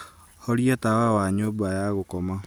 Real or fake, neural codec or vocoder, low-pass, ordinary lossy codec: real; none; none; none